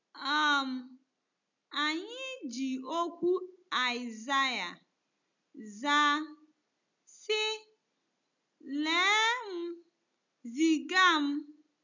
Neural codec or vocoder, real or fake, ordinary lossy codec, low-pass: none; real; none; 7.2 kHz